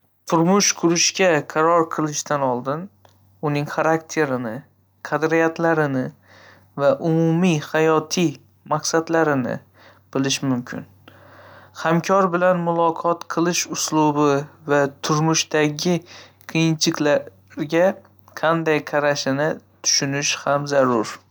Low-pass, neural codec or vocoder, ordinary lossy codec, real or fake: none; none; none; real